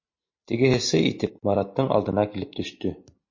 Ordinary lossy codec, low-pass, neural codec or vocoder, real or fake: MP3, 32 kbps; 7.2 kHz; none; real